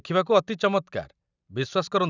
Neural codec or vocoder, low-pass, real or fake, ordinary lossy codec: none; 7.2 kHz; real; none